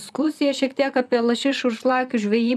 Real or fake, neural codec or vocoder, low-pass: fake; vocoder, 44.1 kHz, 128 mel bands, Pupu-Vocoder; 14.4 kHz